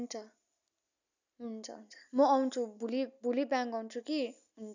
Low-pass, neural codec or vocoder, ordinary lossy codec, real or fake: 7.2 kHz; none; none; real